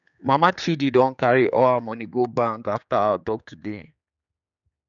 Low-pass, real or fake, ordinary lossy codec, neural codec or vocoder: 7.2 kHz; fake; none; codec, 16 kHz, 4 kbps, X-Codec, HuBERT features, trained on general audio